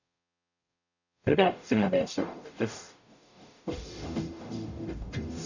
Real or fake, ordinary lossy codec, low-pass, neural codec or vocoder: fake; none; 7.2 kHz; codec, 44.1 kHz, 0.9 kbps, DAC